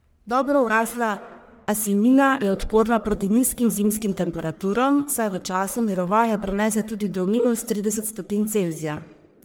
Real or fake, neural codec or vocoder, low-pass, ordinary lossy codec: fake; codec, 44.1 kHz, 1.7 kbps, Pupu-Codec; none; none